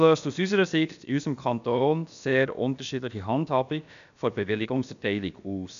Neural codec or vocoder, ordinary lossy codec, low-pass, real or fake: codec, 16 kHz, about 1 kbps, DyCAST, with the encoder's durations; none; 7.2 kHz; fake